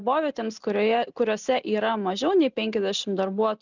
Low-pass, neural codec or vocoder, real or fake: 7.2 kHz; none; real